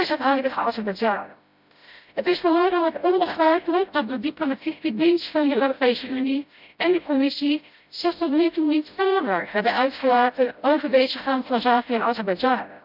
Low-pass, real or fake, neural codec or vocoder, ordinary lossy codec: 5.4 kHz; fake; codec, 16 kHz, 0.5 kbps, FreqCodec, smaller model; none